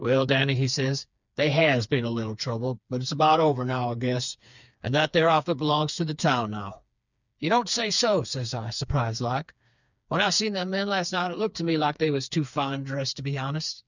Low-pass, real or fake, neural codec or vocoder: 7.2 kHz; fake; codec, 16 kHz, 4 kbps, FreqCodec, smaller model